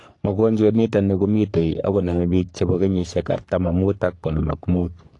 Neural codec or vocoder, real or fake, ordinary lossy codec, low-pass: codec, 44.1 kHz, 3.4 kbps, Pupu-Codec; fake; AAC, 48 kbps; 10.8 kHz